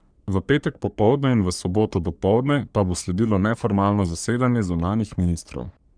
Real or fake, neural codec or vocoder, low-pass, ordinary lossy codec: fake; codec, 44.1 kHz, 3.4 kbps, Pupu-Codec; 9.9 kHz; none